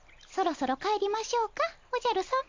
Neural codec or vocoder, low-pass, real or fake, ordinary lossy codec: none; 7.2 kHz; real; MP3, 48 kbps